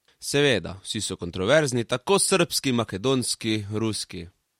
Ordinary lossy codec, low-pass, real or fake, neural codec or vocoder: MP3, 64 kbps; 19.8 kHz; real; none